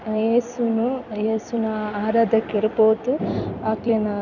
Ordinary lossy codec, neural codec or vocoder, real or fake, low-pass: none; vocoder, 44.1 kHz, 128 mel bands every 256 samples, BigVGAN v2; fake; 7.2 kHz